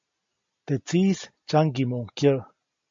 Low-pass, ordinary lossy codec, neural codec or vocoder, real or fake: 7.2 kHz; MP3, 48 kbps; none; real